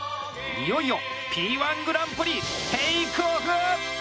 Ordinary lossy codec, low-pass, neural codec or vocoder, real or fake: none; none; none; real